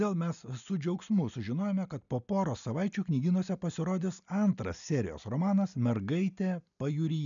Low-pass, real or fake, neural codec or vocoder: 7.2 kHz; real; none